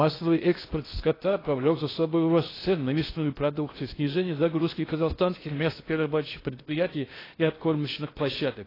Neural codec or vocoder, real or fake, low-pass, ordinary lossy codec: codec, 16 kHz in and 24 kHz out, 0.6 kbps, FocalCodec, streaming, 2048 codes; fake; 5.4 kHz; AAC, 24 kbps